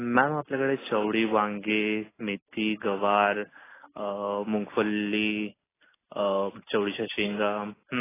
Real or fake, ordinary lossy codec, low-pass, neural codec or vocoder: real; AAC, 16 kbps; 3.6 kHz; none